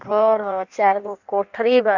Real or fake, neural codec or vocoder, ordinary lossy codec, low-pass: fake; codec, 16 kHz in and 24 kHz out, 1.1 kbps, FireRedTTS-2 codec; none; 7.2 kHz